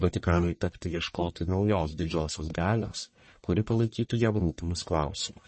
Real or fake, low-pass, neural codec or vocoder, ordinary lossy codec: fake; 10.8 kHz; codec, 44.1 kHz, 1.7 kbps, Pupu-Codec; MP3, 32 kbps